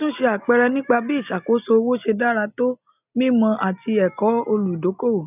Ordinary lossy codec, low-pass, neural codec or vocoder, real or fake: none; 3.6 kHz; none; real